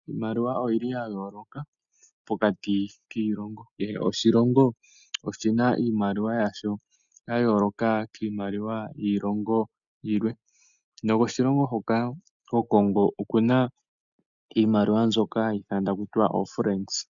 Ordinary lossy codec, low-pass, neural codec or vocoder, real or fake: MP3, 96 kbps; 7.2 kHz; none; real